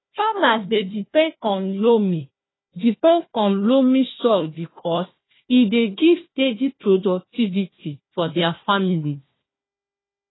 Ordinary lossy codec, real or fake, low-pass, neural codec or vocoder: AAC, 16 kbps; fake; 7.2 kHz; codec, 16 kHz, 1 kbps, FunCodec, trained on Chinese and English, 50 frames a second